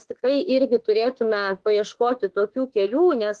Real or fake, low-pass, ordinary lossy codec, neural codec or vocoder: fake; 10.8 kHz; Opus, 16 kbps; autoencoder, 48 kHz, 32 numbers a frame, DAC-VAE, trained on Japanese speech